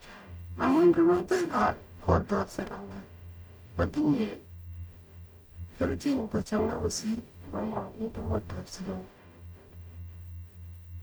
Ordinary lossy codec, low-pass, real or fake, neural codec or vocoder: none; none; fake; codec, 44.1 kHz, 0.9 kbps, DAC